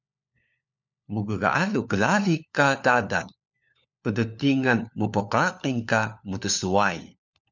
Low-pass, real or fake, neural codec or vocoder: 7.2 kHz; fake; codec, 16 kHz, 4 kbps, FunCodec, trained on LibriTTS, 50 frames a second